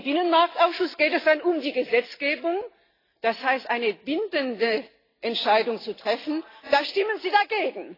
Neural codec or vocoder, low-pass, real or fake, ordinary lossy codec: none; 5.4 kHz; real; AAC, 24 kbps